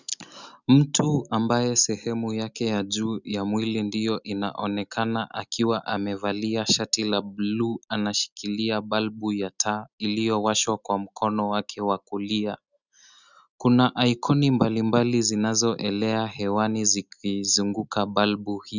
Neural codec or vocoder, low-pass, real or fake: none; 7.2 kHz; real